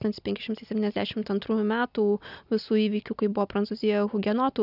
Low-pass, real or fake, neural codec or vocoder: 5.4 kHz; real; none